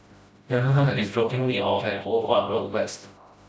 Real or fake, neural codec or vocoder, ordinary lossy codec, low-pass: fake; codec, 16 kHz, 0.5 kbps, FreqCodec, smaller model; none; none